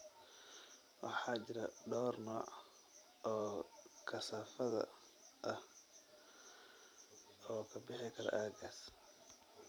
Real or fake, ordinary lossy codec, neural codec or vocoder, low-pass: fake; none; vocoder, 44.1 kHz, 128 mel bands every 512 samples, BigVGAN v2; none